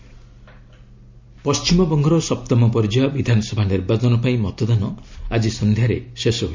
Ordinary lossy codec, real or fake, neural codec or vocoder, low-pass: MP3, 64 kbps; real; none; 7.2 kHz